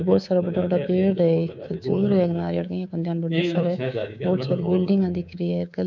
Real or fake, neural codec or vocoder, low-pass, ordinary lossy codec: fake; codec, 24 kHz, 3.1 kbps, DualCodec; 7.2 kHz; none